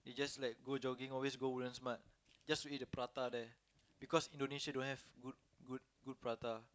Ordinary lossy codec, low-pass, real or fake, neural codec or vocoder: none; none; real; none